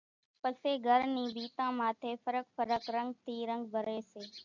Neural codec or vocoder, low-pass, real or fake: none; 7.2 kHz; real